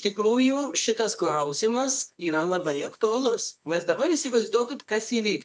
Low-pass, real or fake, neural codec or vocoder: 10.8 kHz; fake; codec, 24 kHz, 0.9 kbps, WavTokenizer, medium music audio release